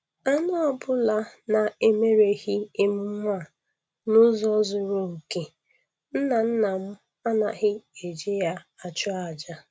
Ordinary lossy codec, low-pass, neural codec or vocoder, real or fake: none; none; none; real